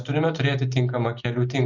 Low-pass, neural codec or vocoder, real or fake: 7.2 kHz; none; real